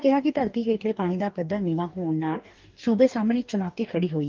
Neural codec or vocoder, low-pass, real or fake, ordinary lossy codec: codec, 44.1 kHz, 2.6 kbps, DAC; 7.2 kHz; fake; Opus, 24 kbps